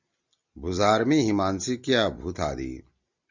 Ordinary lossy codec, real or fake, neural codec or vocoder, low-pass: Opus, 64 kbps; real; none; 7.2 kHz